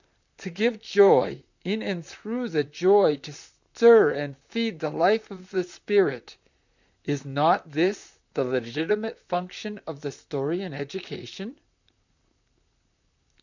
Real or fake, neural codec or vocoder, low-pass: fake; vocoder, 44.1 kHz, 128 mel bands, Pupu-Vocoder; 7.2 kHz